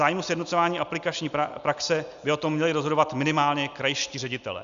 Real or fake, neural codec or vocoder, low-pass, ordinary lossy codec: real; none; 7.2 kHz; Opus, 64 kbps